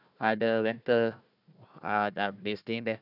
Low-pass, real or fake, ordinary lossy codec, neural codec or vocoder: 5.4 kHz; fake; none; codec, 16 kHz, 1 kbps, FunCodec, trained on Chinese and English, 50 frames a second